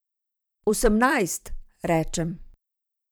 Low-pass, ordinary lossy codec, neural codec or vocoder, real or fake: none; none; vocoder, 44.1 kHz, 128 mel bands every 512 samples, BigVGAN v2; fake